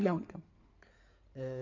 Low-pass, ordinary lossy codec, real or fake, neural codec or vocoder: 7.2 kHz; none; fake; codec, 16 kHz in and 24 kHz out, 2.2 kbps, FireRedTTS-2 codec